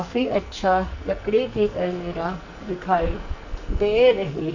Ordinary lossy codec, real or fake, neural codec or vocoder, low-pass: none; fake; codec, 44.1 kHz, 2.6 kbps, SNAC; 7.2 kHz